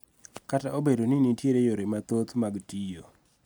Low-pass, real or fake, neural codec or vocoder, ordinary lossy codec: none; real; none; none